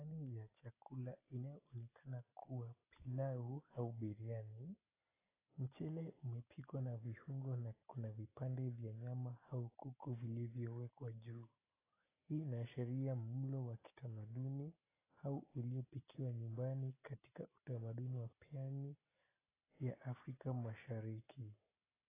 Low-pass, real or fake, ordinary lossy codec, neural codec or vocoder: 3.6 kHz; real; AAC, 16 kbps; none